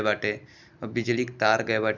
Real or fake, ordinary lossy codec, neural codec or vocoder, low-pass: real; none; none; 7.2 kHz